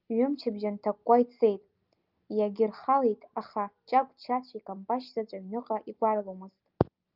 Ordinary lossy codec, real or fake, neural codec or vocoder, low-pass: Opus, 24 kbps; real; none; 5.4 kHz